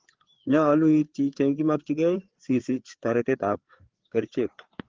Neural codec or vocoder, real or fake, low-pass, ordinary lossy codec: codec, 16 kHz, 4 kbps, FreqCodec, larger model; fake; 7.2 kHz; Opus, 16 kbps